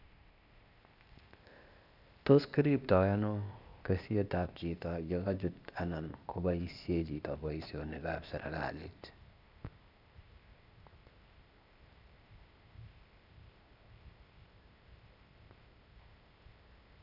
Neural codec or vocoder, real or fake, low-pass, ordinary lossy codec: codec, 16 kHz, 0.8 kbps, ZipCodec; fake; 5.4 kHz; none